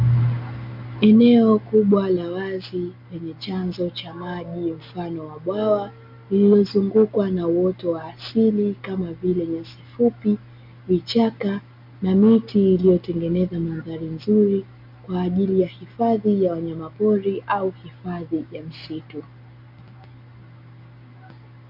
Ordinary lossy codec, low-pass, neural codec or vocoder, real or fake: MP3, 48 kbps; 5.4 kHz; none; real